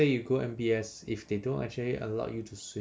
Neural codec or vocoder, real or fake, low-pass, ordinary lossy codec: none; real; none; none